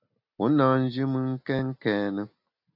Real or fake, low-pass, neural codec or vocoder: real; 5.4 kHz; none